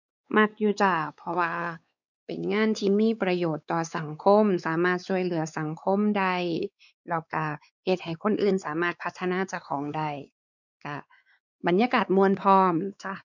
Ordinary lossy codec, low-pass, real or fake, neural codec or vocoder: none; 7.2 kHz; fake; codec, 16 kHz, 4 kbps, X-Codec, WavLM features, trained on Multilingual LibriSpeech